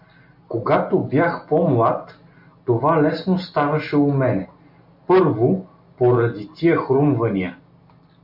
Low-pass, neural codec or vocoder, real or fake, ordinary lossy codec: 5.4 kHz; none; real; MP3, 48 kbps